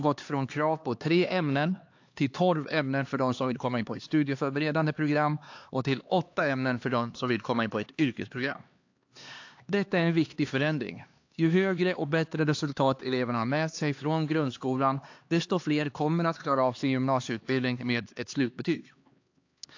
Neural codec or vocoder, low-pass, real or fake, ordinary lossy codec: codec, 16 kHz, 2 kbps, X-Codec, HuBERT features, trained on LibriSpeech; 7.2 kHz; fake; AAC, 48 kbps